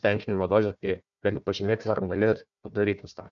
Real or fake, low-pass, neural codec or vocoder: fake; 7.2 kHz; codec, 16 kHz, 1 kbps, FunCodec, trained on Chinese and English, 50 frames a second